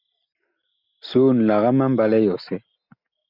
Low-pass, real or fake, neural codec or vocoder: 5.4 kHz; real; none